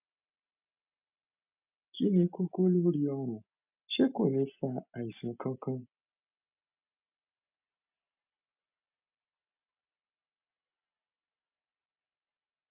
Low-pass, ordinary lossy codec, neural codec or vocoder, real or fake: 3.6 kHz; none; none; real